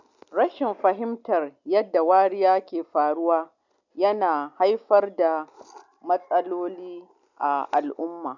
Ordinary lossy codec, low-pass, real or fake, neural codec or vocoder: none; 7.2 kHz; real; none